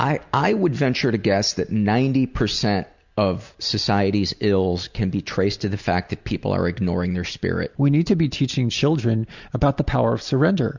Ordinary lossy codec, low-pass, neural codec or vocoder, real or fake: Opus, 64 kbps; 7.2 kHz; none; real